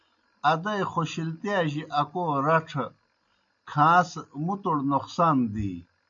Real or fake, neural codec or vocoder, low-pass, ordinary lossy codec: real; none; 7.2 kHz; MP3, 64 kbps